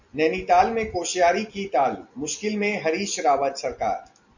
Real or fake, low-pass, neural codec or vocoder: real; 7.2 kHz; none